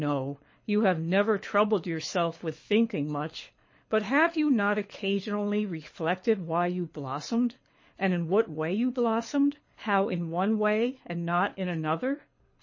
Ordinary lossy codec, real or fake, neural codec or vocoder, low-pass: MP3, 32 kbps; fake; codec, 24 kHz, 6 kbps, HILCodec; 7.2 kHz